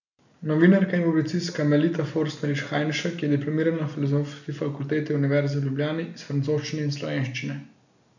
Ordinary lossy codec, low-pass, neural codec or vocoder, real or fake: none; 7.2 kHz; none; real